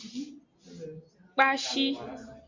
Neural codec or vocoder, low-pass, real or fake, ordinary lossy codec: none; 7.2 kHz; real; MP3, 48 kbps